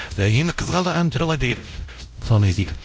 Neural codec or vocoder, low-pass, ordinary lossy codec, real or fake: codec, 16 kHz, 0.5 kbps, X-Codec, WavLM features, trained on Multilingual LibriSpeech; none; none; fake